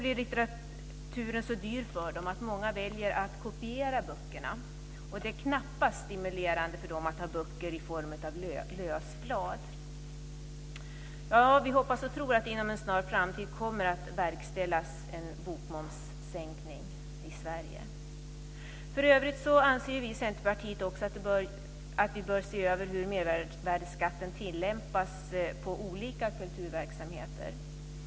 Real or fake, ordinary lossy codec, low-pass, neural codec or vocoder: real; none; none; none